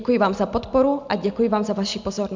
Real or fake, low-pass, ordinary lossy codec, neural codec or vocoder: real; 7.2 kHz; MP3, 64 kbps; none